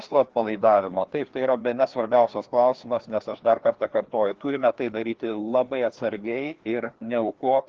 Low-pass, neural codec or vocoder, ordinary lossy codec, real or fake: 7.2 kHz; codec, 16 kHz, 2 kbps, FreqCodec, larger model; Opus, 16 kbps; fake